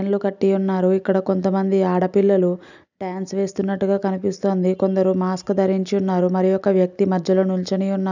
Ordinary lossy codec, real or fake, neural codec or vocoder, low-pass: none; real; none; 7.2 kHz